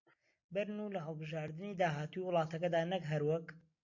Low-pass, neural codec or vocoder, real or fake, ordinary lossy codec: 7.2 kHz; none; real; MP3, 48 kbps